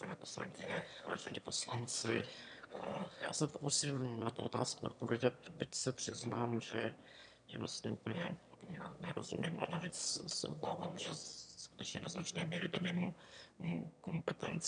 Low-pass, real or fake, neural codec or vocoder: 9.9 kHz; fake; autoencoder, 22.05 kHz, a latent of 192 numbers a frame, VITS, trained on one speaker